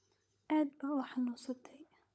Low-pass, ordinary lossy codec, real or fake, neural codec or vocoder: none; none; fake; codec, 16 kHz, 16 kbps, FunCodec, trained on LibriTTS, 50 frames a second